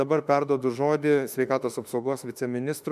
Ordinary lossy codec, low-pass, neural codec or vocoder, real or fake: MP3, 96 kbps; 14.4 kHz; autoencoder, 48 kHz, 32 numbers a frame, DAC-VAE, trained on Japanese speech; fake